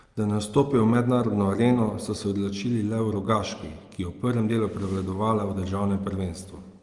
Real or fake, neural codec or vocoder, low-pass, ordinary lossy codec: real; none; 10.8 kHz; Opus, 24 kbps